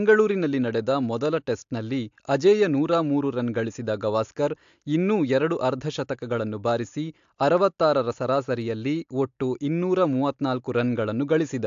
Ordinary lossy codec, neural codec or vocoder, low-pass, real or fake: AAC, 64 kbps; none; 7.2 kHz; real